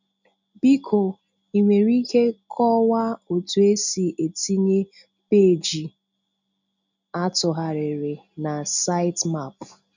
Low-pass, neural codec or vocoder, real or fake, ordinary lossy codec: 7.2 kHz; none; real; none